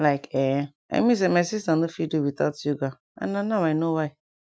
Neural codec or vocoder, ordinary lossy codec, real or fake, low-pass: none; none; real; none